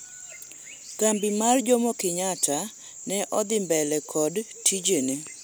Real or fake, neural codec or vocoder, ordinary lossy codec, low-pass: real; none; none; none